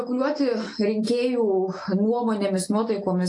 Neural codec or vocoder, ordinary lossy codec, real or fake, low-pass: none; AAC, 48 kbps; real; 10.8 kHz